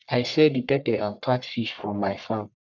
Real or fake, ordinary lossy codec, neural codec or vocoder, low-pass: fake; none; codec, 44.1 kHz, 1.7 kbps, Pupu-Codec; 7.2 kHz